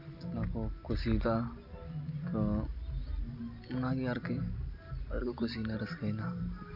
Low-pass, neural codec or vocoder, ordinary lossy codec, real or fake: 5.4 kHz; none; none; real